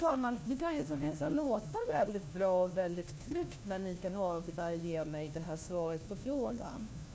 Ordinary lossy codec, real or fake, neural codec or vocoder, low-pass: none; fake; codec, 16 kHz, 1 kbps, FunCodec, trained on LibriTTS, 50 frames a second; none